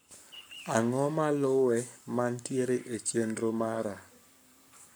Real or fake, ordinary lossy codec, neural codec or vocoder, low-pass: fake; none; codec, 44.1 kHz, 7.8 kbps, Pupu-Codec; none